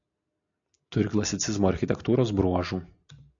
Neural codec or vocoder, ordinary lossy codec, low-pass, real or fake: none; AAC, 64 kbps; 7.2 kHz; real